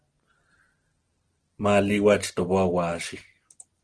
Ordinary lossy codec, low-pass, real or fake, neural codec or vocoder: Opus, 16 kbps; 9.9 kHz; real; none